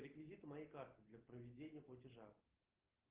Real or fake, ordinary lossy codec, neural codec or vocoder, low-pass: real; Opus, 16 kbps; none; 3.6 kHz